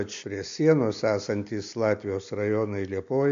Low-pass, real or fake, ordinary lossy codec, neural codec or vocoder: 7.2 kHz; real; MP3, 64 kbps; none